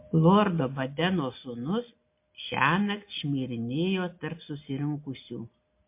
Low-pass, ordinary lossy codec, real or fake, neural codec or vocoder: 3.6 kHz; MP3, 24 kbps; real; none